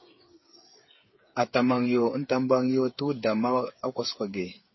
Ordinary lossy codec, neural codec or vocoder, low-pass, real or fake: MP3, 24 kbps; codec, 16 kHz, 8 kbps, FreqCodec, smaller model; 7.2 kHz; fake